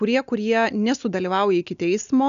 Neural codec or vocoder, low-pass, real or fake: none; 7.2 kHz; real